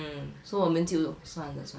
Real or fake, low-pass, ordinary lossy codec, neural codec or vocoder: real; none; none; none